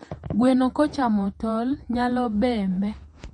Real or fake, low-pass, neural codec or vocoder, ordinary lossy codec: fake; 19.8 kHz; vocoder, 48 kHz, 128 mel bands, Vocos; MP3, 48 kbps